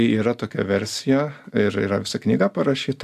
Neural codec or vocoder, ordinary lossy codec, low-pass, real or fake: none; AAC, 96 kbps; 14.4 kHz; real